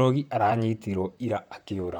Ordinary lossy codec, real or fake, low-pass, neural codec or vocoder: none; fake; 19.8 kHz; vocoder, 44.1 kHz, 128 mel bands, Pupu-Vocoder